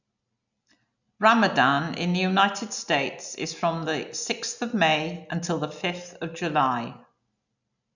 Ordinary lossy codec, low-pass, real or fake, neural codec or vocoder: none; 7.2 kHz; real; none